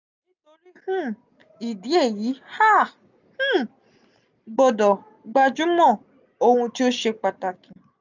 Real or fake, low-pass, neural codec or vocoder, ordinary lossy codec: real; 7.2 kHz; none; none